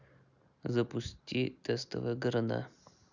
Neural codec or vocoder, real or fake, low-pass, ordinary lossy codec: none; real; 7.2 kHz; none